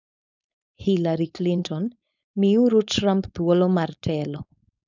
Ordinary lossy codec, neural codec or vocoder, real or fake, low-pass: none; codec, 16 kHz, 4.8 kbps, FACodec; fake; 7.2 kHz